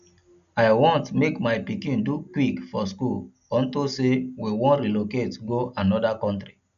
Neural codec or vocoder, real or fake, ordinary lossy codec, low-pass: none; real; none; 7.2 kHz